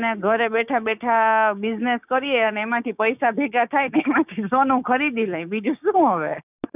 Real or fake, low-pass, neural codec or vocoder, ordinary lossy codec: fake; 3.6 kHz; codec, 16 kHz, 6 kbps, DAC; none